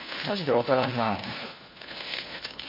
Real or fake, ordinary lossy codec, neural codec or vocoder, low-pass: fake; MP3, 24 kbps; codec, 16 kHz, 1 kbps, FunCodec, trained on LibriTTS, 50 frames a second; 5.4 kHz